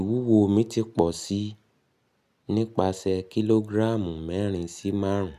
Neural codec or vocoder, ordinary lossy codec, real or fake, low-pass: none; none; real; 14.4 kHz